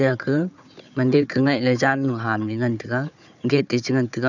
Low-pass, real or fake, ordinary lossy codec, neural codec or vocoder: 7.2 kHz; fake; none; codec, 16 kHz, 4 kbps, FunCodec, trained on Chinese and English, 50 frames a second